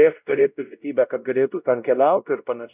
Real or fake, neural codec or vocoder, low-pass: fake; codec, 16 kHz, 0.5 kbps, X-Codec, WavLM features, trained on Multilingual LibriSpeech; 3.6 kHz